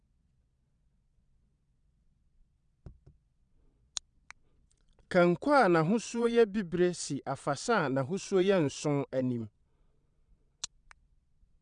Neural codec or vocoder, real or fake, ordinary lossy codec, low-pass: vocoder, 22.05 kHz, 80 mel bands, Vocos; fake; none; 9.9 kHz